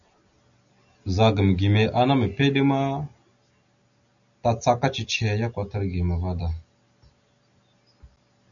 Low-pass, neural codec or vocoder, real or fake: 7.2 kHz; none; real